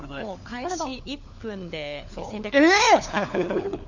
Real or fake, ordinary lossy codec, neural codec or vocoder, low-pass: fake; none; codec, 16 kHz, 4 kbps, FunCodec, trained on LibriTTS, 50 frames a second; 7.2 kHz